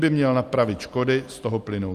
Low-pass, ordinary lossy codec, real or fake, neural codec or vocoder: 14.4 kHz; Opus, 32 kbps; real; none